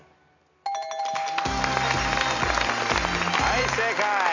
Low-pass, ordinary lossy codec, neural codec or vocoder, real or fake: 7.2 kHz; none; none; real